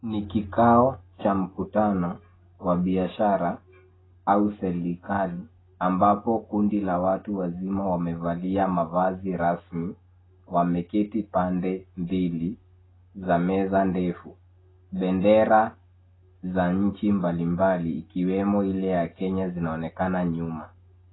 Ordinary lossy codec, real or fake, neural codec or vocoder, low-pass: AAC, 16 kbps; real; none; 7.2 kHz